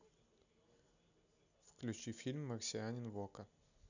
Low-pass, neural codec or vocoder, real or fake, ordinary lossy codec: 7.2 kHz; none; real; none